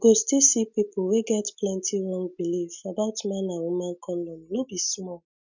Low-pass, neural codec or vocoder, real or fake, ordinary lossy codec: 7.2 kHz; none; real; none